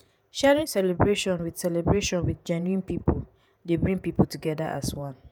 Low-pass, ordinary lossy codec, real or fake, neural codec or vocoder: none; none; fake; vocoder, 48 kHz, 128 mel bands, Vocos